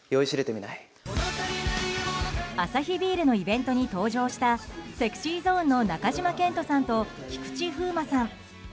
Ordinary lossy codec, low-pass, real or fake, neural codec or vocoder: none; none; real; none